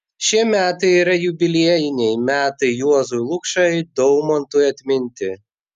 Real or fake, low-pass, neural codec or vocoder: real; 14.4 kHz; none